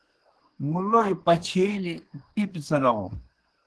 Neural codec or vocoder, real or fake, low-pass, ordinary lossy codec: codec, 24 kHz, 1 kbps, SNAC; fake; 10.8 kHz; Opus, 16 kbps